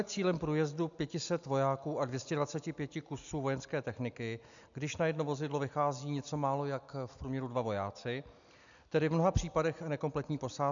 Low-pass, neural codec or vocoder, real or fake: 7.2 kHz; none; real